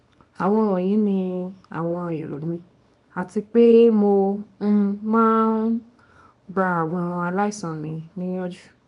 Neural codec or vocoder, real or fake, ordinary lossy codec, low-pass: codec, 24 kHz, 0.9 kbps, WavTokenizer, small release; fake; none; 10.8 kHz